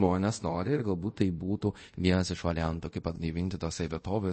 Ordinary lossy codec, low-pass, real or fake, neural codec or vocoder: MP3, 32 kbps; 9.9 kHz; fake; codec, 24 kHz, 0.5 kbps, DualCodec